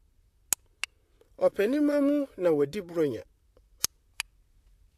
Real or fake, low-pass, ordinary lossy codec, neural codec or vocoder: fake; 14.4 kHz; AAC, 64 kbps; vocoder, 44.1 kHz, 128 mel bands, Pupu-Vocoder